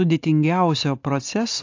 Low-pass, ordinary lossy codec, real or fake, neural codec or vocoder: 7.2 kHz; AAC, 48 kbps; real; none